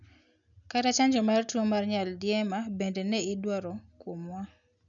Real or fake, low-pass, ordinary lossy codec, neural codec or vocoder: real; 7.2 kHz; none; none